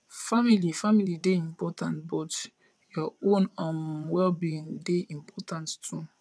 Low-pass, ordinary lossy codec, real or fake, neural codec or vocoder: none; none; fake; vocoder, 22.05 kHz, 80 mel bands, WaveNeXt